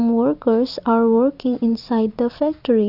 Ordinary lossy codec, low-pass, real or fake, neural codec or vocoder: AAC, 48 kbps; 5.4 kHz; fake; vocoder, 44.1 kHz, 128 mel bands every 256 samples, BigVGAN v2